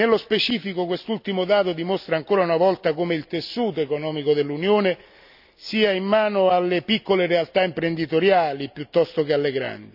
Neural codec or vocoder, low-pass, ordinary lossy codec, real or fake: none; 5.4 kHz; none; real